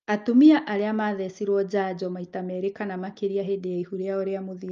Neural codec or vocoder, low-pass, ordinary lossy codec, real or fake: none; 7.2 kHz; Opus, 24 kbps; real